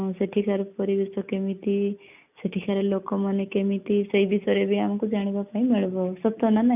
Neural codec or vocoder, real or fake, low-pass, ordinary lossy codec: none; real; 3.6 kHz; none